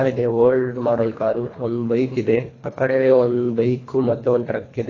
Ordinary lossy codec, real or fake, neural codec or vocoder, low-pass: AAC, 32 kbps; fake; codec, 24 kHz, 1.5 kbps, HILCodec; 7.2 kHz